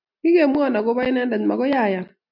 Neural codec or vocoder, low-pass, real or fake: none; 5.4 kHz; real